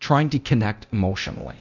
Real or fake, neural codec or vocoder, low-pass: fake; codec, 24 kHz, 0.9 kbps, DualCodec; 7.2 kHz